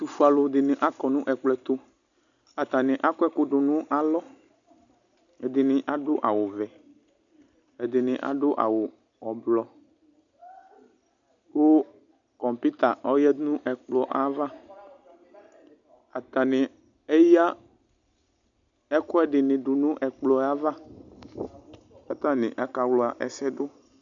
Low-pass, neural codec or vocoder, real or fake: 7.2 kHz; none; real